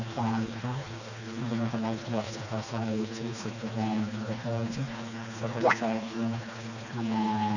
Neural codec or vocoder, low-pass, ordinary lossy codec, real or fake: codec, 16 kHz, 2 kbps, FreqCodec, smaller model; 7.2 kHz; none; fake